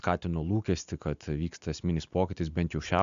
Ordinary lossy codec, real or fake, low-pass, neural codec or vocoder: MP3, 64 kbps; real; 7.2 kHz; none